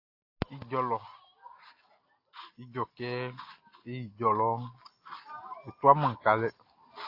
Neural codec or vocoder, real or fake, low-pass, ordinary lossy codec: none; real; 5.4 kHz; AAC, 32 kbps